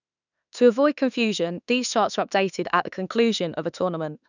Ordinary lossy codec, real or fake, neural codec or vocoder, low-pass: none; fake; autoencoder, 48 kHz, 32 numbers a frame, DAC-VAE, trained on Japanese speech; 7.2 kHz